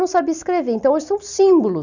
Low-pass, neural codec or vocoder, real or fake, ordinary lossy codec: 7.2 kHz; none; real; none